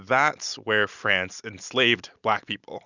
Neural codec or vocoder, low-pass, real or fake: none; 7.2 kHz; real